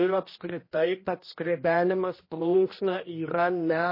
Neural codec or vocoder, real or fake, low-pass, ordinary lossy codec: codec, 16 kHz, 1 kbps, X-Codec, HuBERT features, trained on general audio; fake; 5.4 kHz; MP3, 24 kbps